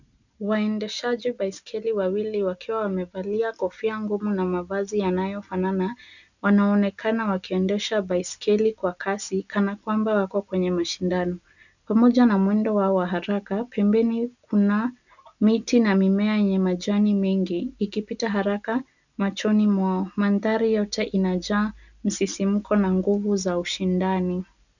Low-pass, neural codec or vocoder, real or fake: 7.2 kHz; none; real